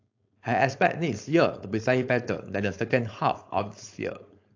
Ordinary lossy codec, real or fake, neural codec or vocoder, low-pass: AAC, 48 kbps; fake; codec, 16 kHz, 4.8 kbps, FACodec; 7.2 kHz